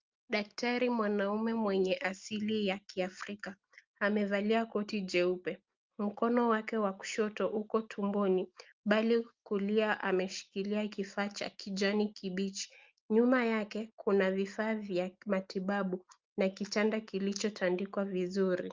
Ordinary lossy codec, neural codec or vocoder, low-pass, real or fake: Opus, 24 kbps; none; 7.2 kHz; real